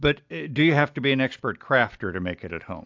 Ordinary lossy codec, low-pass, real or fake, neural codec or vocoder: AAC, 48 kbps; 7.2 kHz; real; none